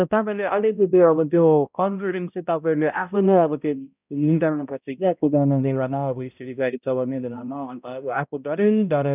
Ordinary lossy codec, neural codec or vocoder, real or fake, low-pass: none; codec, 16 kHz, 0.5 kbps, X-Codec, HuBERT features, trained on balanced general audio; fake; 3.6 kHz